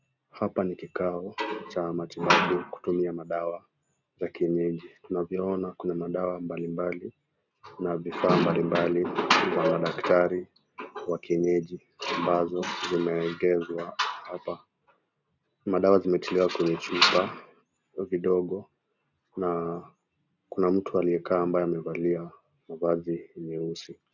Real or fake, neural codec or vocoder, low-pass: real; none; 7.2 kHz